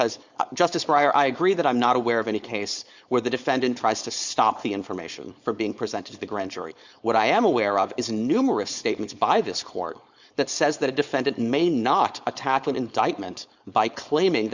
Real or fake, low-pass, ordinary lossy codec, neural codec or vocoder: fake; 7.2 kHz; Opus, 64 kbps; codec, 16 kHz, 4.8 kbps, FACodec